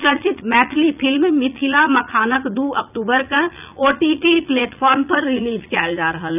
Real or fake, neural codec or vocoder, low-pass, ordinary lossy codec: fake; vocoder, 44.1 kHz, 80 mel bands, Vocos; 3.6 kHz; none